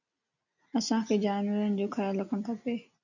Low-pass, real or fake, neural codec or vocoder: 7.2 kHz; real; none